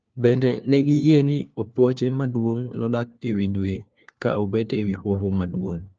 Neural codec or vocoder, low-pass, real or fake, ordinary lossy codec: codec, 16 kHz, 1 kbps, FunCodec, trained on LibriTTS, 50 frames a second; 7.2 kHz; fake; Opus, 24 kbps